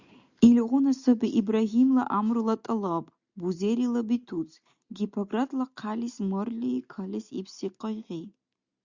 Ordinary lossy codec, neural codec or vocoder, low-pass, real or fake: Opus, 64 kbps; none; 7.2 kHz; real